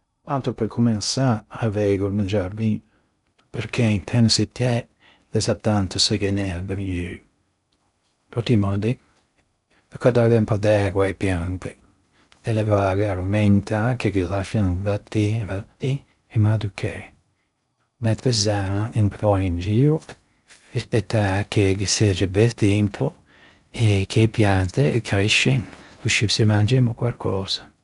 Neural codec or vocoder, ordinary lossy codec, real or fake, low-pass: codec, 16 kHz in and 24 kHz out, 0.6 kbps, FocalCodec, streaming, 2048 codes; none; fake; 10.8 kHz